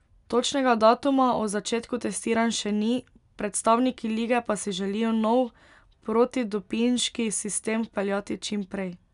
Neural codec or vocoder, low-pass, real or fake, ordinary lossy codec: none; 10.8 kHz; real; none